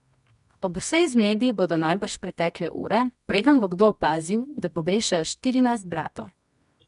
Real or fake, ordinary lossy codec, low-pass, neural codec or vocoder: fake; none; 10.8 kHz; codec, 24 kHz, 0.9 kbps, WavTokenizer, medium music audio release